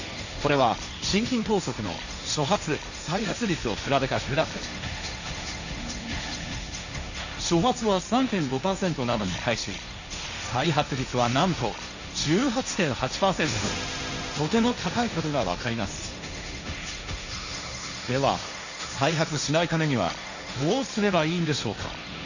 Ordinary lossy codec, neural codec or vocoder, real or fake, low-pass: none; codec, 16 kHz, 1.1 kbps, Voila-Tokenizer; fake; 7.2 kHz